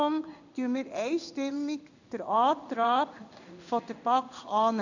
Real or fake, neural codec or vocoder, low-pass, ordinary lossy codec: fake; codec, 16 kHz in and 24 kHz out, 1 kbps, XY-Tokenizer; 7.2 kHz; none